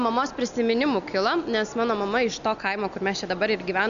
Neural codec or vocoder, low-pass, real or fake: none; 7.2 kHz; real